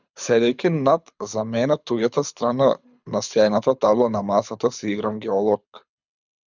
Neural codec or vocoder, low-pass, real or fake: codec, 24 kHz, 6 kbps, HILCodec; 7.2 kHz; fake